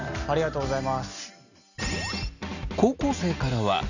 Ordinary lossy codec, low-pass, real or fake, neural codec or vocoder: none; 7.2 kHz; real; none